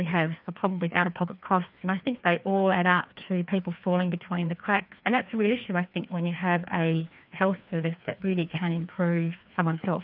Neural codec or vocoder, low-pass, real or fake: codec, 16 kHz, 2 kbps, FreqCodec, larger model; 5.4 kHz; fake